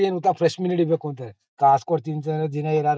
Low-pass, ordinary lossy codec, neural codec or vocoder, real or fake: none; none; none; real